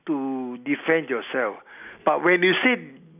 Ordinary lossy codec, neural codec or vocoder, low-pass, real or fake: none; none; 3.6 kHz; real